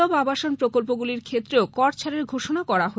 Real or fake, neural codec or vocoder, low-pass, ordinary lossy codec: real; none; none; none